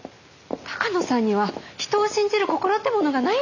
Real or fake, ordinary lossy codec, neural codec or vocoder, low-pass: real; none; none; 7.2 kHz